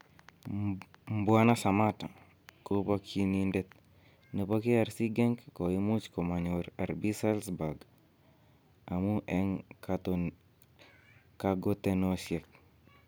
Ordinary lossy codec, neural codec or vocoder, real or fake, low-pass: none; none; real; none